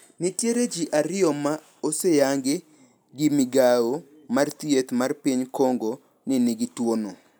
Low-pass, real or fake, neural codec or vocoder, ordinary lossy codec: none; real; none; none